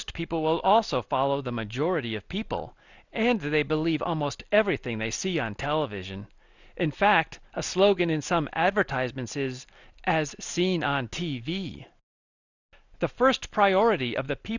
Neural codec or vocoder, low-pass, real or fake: none; 7.2 kHz; real